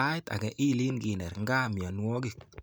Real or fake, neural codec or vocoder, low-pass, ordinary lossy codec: real; none; none; none